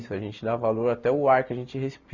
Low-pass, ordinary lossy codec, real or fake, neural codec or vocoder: 7.2 kHz; none; real; none